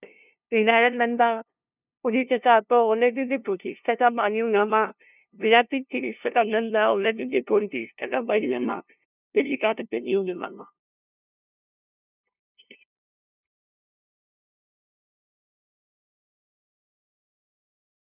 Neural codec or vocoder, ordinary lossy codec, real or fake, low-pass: codec, 16 kHz, 0.5 kbps, FunCodec, trained on LibriTTS, 25 frames a second; none; fake; 3.6 kHz